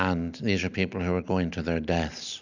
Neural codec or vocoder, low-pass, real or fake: none; 7.2 kHz; real